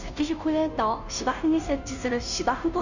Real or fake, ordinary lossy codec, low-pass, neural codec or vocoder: fake; none; 7.2 kHz; codec, 16 kHz, 0.5 kbps, FunCodec, trained on Chinese and English, 25 frames a second